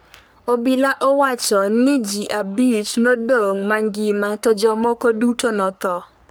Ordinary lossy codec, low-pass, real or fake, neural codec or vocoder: none; none; fake; codec, 44.1 kHz, 3.4 kbps, Pupu-Codec